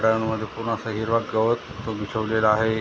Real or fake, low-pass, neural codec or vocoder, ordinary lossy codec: real; none; none; none